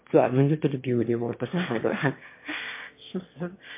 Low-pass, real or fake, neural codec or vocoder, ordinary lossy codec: 3.6 kHz; fake; autoencoder, 22.05 kHz, a latent of 192 numbers a frame, VITS, trained on one speaker; MP3, 24 kbps